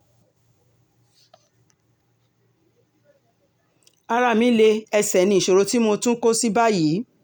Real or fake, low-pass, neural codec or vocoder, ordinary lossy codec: real; none; none; none